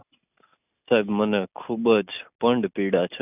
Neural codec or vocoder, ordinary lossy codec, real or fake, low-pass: none; none; real; 3.6 kHz